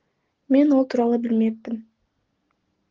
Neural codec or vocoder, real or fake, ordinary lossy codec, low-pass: none; real; Opus, 16 kbps; 7.2 kHz